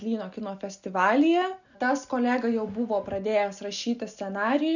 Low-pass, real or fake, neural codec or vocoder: 7.2 kHz; real; none